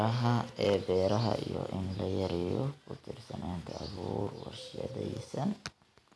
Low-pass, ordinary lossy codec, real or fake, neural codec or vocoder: none; none; real; none